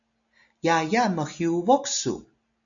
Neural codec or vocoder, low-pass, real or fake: none; 7.2 kHz; real